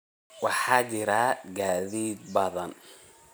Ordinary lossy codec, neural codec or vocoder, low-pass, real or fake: none; none; none; real